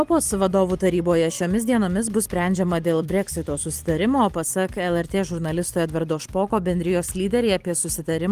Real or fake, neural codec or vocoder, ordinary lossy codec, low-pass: fake; vocoder, 44.1 kHz, 128 mel bands every 256 samples, BigVGAN v2; Opus, 24 kbps; 14.4 kHz